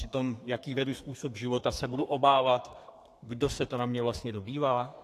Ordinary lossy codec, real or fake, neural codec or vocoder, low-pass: AAC, 64 kbps; fake; codec, 32 kHz, 1.9 kbps, SNAC; 14.4 kHz